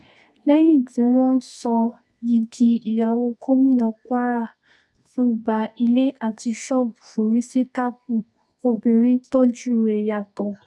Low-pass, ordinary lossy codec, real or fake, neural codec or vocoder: none; none; fake; codec, 24 kHz, 0.9 kbps, WavTokenizer, medium music audio release